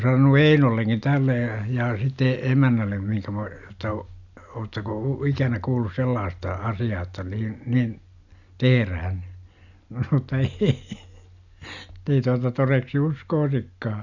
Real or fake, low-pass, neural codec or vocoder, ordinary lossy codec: real; 7.2 kHz; none; none